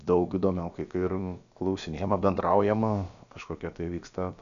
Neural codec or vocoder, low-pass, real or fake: codec, 16 kHz, about 1 kbps, DyCAST, with the encoder's durations; 7.2 kHz; fake